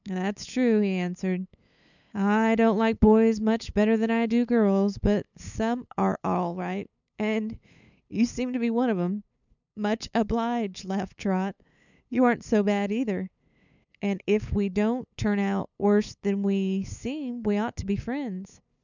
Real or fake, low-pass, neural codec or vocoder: fake; 7.2 kHz; codec, 16 kHz, 8 kbps, FunCodec, trained on LibriTTS, 25 frames a second